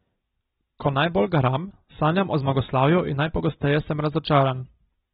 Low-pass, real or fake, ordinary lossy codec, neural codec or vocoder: 19.8 kHz; real; AAC, 16 kbps; none